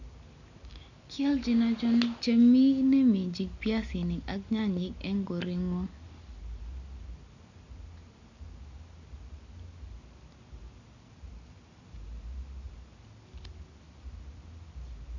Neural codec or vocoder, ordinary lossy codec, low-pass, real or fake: none; none; 7.2 kHz; real